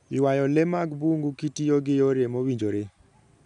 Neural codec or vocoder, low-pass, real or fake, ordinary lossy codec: none; 10.8 kHz; real; none